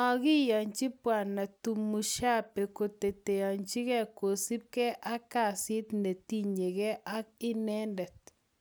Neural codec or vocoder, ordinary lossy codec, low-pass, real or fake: none; none; none; real